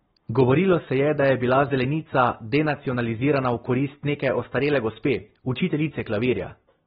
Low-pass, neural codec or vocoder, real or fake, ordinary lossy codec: 7.2 kHz; none; real; AAC, 16 kbps